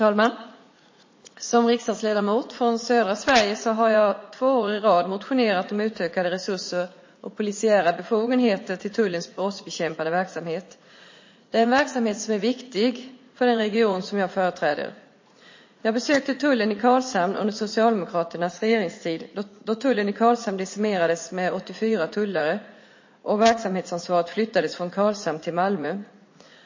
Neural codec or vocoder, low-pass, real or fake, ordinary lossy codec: vocoder, 44.1 kHz, 80 mel bands, Vocos; 7.2 kHz; fake; MP3, 32 kbps